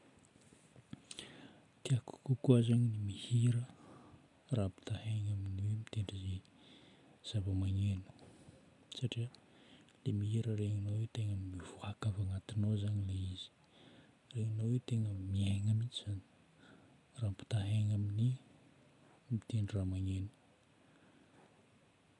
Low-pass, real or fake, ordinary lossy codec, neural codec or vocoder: 10.8 kHz; real; none; none